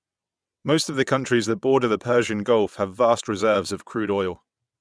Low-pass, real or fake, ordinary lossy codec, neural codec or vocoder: none; fake; none; vocoder, 22.05 kHz, 80 mel bands, WaveNeXt